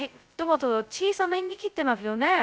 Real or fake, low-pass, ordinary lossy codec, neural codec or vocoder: fake; none; none; codec, 16 kHz, 0.2 kbps, FocalCodec